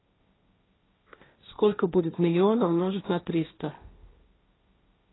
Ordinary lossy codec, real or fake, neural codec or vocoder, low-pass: AAC, 16 kbps; fake; codec, 16 kHz, 1.1 kbps, Voila-Tokenizer; 7.2 kHz